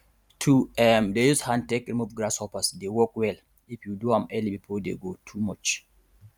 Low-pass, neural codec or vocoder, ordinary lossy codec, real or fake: 19.8 kHz; vocoder, 44.1 kHz, 128 mel bands every 512 samples, BigVGAN v2; none; fake